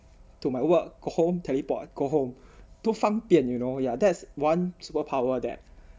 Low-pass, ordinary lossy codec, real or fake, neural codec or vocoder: none; none; real; none